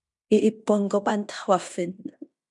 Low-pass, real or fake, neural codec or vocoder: 10.8 kHz; fake; codec, 16 kHz in and 24 kHz out, 0.9 kbps, LongCat-Audio-Codec, fine tuned four codebook decoder